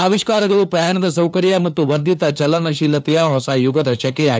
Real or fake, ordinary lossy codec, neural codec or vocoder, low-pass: fake; none; codec, 16 kHz, 2 kbps, FunCodec, trained on LibriTTS, 25 frames a second; none